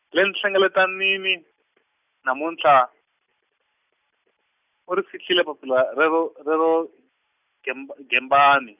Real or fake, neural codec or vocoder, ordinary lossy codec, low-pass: real; none; none; 3.6 kHz